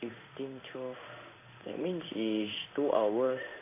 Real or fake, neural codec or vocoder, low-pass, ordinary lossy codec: fake; codec, 16 kHz in and 24 kHz out, 1 kbps, XY-Tokenizer; 3.6 kHz; AAC, 24 kbps